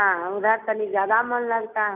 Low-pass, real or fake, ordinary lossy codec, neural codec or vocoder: 3.6 kHz; real; none; none